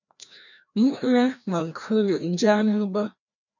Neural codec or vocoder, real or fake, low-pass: codec, 16 kHz, 1 kbps, FreqCodec, larger model; fake; 7.2 kHz